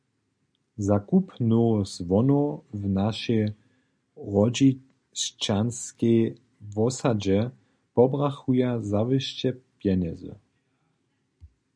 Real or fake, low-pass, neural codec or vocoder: real; 9.9 kHz; none